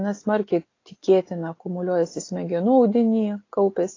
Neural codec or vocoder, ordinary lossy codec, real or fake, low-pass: none; AAC, 32 kbps; real; 7.2 kHz